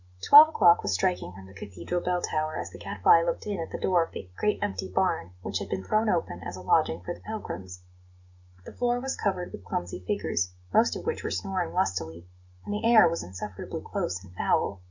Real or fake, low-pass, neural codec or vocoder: real; 7.2 kHz; none